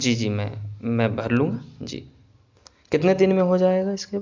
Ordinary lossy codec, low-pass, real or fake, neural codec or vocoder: MP3, 64 kbps; 7.2 kHz; real; none